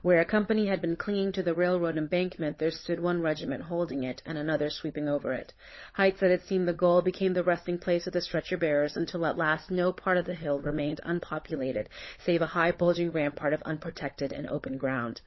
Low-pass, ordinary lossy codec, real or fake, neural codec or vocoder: 7.2 kHz; MP3, 24 kbps; fake; codec, 16 kHz, 16 kbps, FunCodec, trained on LibriTTS, 50 frames a second